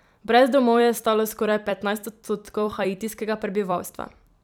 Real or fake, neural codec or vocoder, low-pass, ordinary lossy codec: fake; vocoder, 44.1 kHz, 128 mel bands every 256 samples, BigVGAN v2; 19.8 kHz; none